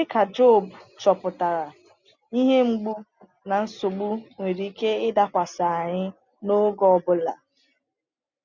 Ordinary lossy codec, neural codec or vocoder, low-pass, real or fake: none; none; 7.2 kHz; real